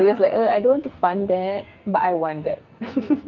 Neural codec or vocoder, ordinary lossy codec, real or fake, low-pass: codec, 44.1 kHz, 2.6 kbps, SNAC; Opus, 32 kbps; fake; 7.2 kHz